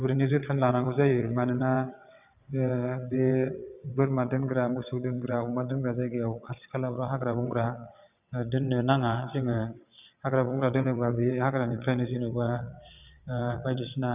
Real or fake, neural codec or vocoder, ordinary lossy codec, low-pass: fake; vocoder, 22.05 kHz, 80 mel bands, WaveNeXt; none; 3.6 kHz